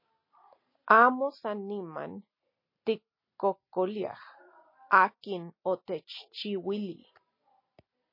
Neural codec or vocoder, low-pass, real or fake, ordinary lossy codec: none; 5.4 kHz; real; MP3, 24 kbps